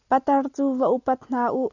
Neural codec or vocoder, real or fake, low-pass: none; real; 7.2 kHz